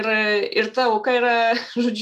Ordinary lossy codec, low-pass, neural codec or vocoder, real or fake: MP3, 96 kbps; 14.4 kHz; none; real